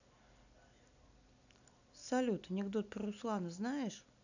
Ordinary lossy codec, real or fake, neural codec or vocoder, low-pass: none; real; none; 7.2 kHz